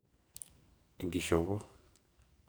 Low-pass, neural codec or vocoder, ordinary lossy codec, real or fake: none; codec, 44.1 kHz, 2.6 kbps, SNAC; none; fake